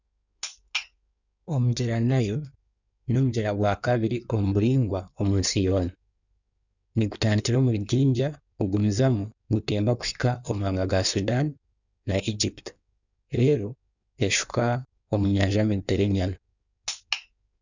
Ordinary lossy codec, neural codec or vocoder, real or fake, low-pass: none; codec, 16 kHz in and 24 kHz out, 1.1 kbps, FireRedTTS-2 codec; fake; 7.2 kHz